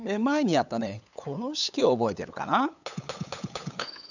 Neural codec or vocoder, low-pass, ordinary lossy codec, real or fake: codec, 16 kHz, 8 kbps, FunCodec, trained on LibriTTS, 25 frames a second; 7.2 kHz; none; fake